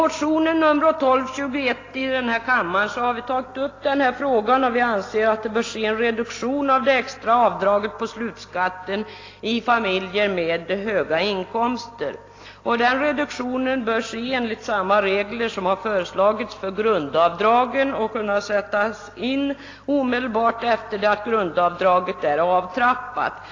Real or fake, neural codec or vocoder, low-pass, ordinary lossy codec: real; none; 7.2 kHz; AAC, 32 kbps